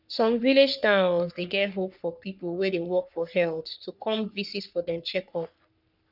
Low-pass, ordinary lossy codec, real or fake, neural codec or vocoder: 5.4 kHz; none; fake; codec, 44.1 kHz, 3.4 kbps, Pupu-Codec